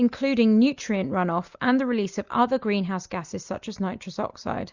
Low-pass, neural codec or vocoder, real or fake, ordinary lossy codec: 7.2 kHz; none; real; Opus, 64 kbps